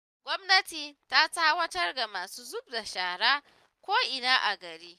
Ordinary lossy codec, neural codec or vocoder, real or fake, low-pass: none; none; real; 14.4 kHz